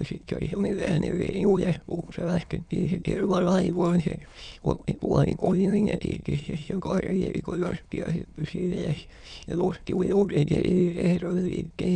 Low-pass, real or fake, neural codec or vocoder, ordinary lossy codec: 9.9 kHz; fake; autoencoder, 22.05 kHz, a latent of 192 numbers a frame, VITS, trained on many speakers; none